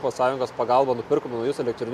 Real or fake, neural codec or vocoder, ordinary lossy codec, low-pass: real; none; MP3, 96 kbps; 14.4 kHz